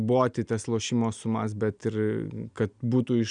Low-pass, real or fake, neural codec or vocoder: 9.9 kHz; real; none